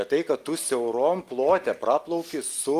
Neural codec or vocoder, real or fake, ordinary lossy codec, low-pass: none; real; Opus, 24 kbps; 14.4 kHz